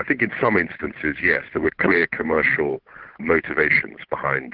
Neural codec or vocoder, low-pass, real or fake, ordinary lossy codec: codec, 16 kHz, 8 kbps, FunCodec, trained on Chinese and English, 25 frames a second; 5.4 kHz; fake; Opus, 16 kbps